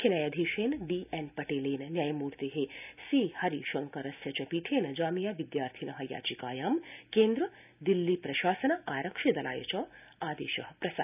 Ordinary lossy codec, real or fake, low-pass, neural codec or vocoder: none; real; 3.6 kHz; none